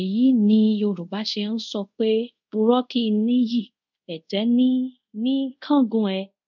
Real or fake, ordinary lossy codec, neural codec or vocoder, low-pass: fake; none; codec, 24 kHz, 0.5 kbps, DualCodec; 7.2 kHz